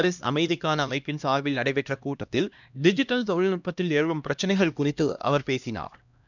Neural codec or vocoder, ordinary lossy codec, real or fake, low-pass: codec, 16 kHz, 1 kbps, X-Codec, HuBERT features, trained on LibriSpeech; none; fake; 7.2 kHz